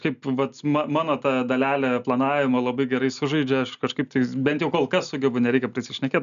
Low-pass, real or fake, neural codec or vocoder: 7.2 kHz; real; none